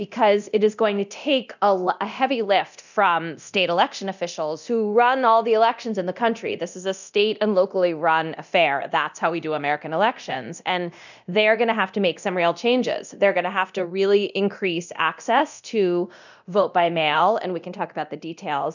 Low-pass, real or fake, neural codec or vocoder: 7.2 kHz; fake; codec, 24 kHz, 0.9 kbps, DualCodec